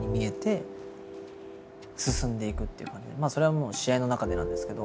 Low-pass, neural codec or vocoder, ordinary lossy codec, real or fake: none; none; none; real